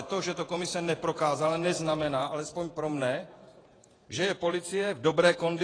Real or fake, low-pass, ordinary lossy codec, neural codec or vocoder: fake; 9.9 kHz; AAC, 32 kbps; vocoder, 48 kHz, 128 mel bands, Vocos